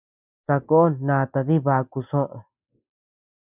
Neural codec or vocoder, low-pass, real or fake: none; 3.6 kHz; real